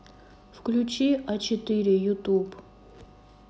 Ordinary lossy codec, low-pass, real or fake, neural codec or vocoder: none; none; real; none